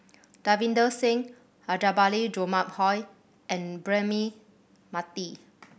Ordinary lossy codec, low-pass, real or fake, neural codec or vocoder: none; none; real; none